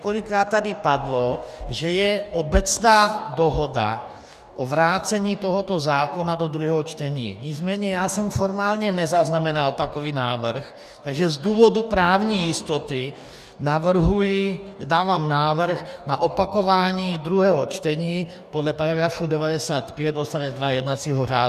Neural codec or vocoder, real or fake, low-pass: codec, 44.1 kHz, 2.6 kbps, DAC; fake; 14.4 kHz